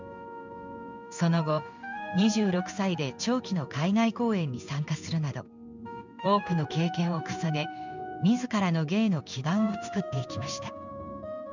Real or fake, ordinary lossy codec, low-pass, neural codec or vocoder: fake; none; 7.2 kHz; codec, 16 kHz in and 24 kHz out, 1 kbps, XY-Tokenizer